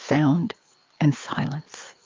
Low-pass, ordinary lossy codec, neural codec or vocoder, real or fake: 7.2 kHz; Opus, 24 kbps; codec, 16 kHz, 4 kbps, FreqCodec, larger model; fake